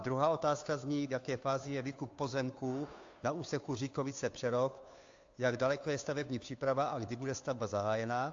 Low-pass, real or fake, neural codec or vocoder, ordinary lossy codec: 7.2 kHz; fake; codec, 16 kHz, 2 kbps, FunCodec, trained on Chinese and English, 25 frames a second; AAC, 48 kbps